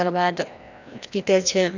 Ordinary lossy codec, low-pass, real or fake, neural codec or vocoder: none; 7.2 kHz; fake; codec, 16 kHz, 1 kbps, FreqCodec, larger model